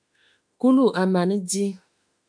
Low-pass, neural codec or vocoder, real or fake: 9.9 kHz; autoencoder, 48 kHz, 32 numbers a frame, DAC-VAE, trained on Japanese speech; fake